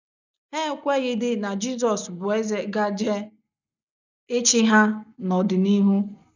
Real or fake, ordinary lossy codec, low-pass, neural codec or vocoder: real; none; 7.2 kHz; none